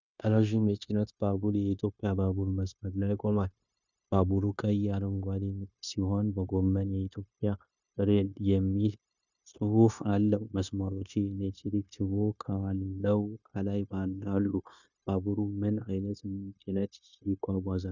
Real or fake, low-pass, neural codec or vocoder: fake; 7.2 kHz; codec, 16 kHz, 0.9 kbps, LongCat-Audio-Codec